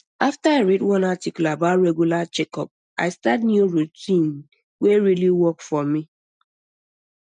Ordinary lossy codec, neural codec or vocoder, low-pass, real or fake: none; none; 9.9 kHz; real